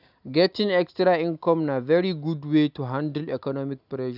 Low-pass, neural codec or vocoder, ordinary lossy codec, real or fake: 5.4 kHz; none; none; real